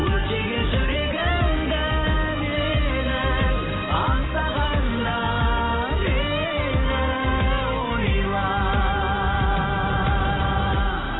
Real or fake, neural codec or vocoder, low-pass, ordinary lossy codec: real; none; 7.2 kHz; AAC, 16 kbps